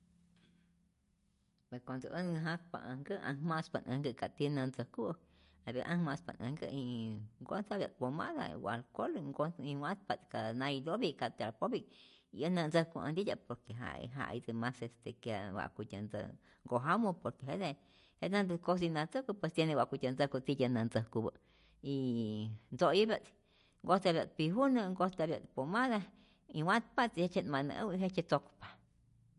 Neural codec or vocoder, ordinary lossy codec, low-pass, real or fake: none; MP3, 48 kbps; 14.4 kHz; real